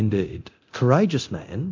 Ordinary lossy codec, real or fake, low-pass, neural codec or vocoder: MP3, 64 kbps; fake; 7.2 kHz; codec, 24 kHz, 0.9 kbps, DualCodec